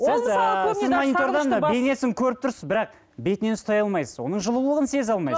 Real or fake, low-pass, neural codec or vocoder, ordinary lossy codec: real; none; none; none